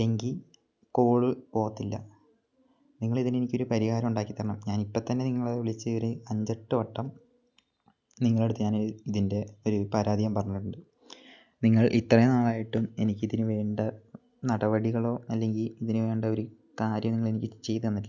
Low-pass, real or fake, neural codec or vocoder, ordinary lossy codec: 7.2 kHz; real; none; none